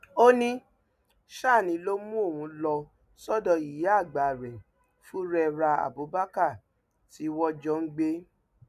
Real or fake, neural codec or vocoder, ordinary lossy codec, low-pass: real; none; none; 14.4 kHz